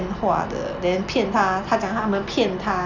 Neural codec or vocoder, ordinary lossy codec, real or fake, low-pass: none; none; real; 7.2 kHz